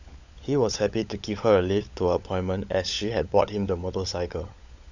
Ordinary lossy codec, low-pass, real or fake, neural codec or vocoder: none; 7.2 kHz; fake; codec, 16 kHz, 16 kbps, FunCodec, trained on LibriTTS, 50 frames a second